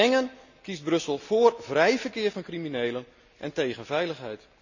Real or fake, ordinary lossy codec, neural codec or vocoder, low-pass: real; none; none; 7.2 kHz